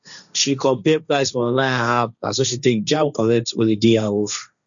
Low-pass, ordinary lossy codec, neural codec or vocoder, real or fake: 7.2 kHz; none; codec, 16 kHz, 1.1 kbps, Voila-Tokenizer; fake